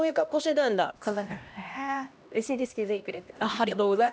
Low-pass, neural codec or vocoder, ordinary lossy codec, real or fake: none; codec, 16 kHz, 1 kbps, X-Codec, HuBERT features, trained on LibriSpeech; none; fake